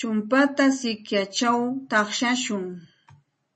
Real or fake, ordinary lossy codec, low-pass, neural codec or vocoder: real; MP3, 32 kbps; 10.8 kHz; none